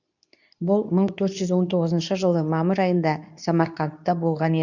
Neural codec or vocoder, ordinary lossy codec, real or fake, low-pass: codec, 24 kHz, 0.9 kbps, WavTokenizer, medium speech release version 2; none; fake; 7.2 kHz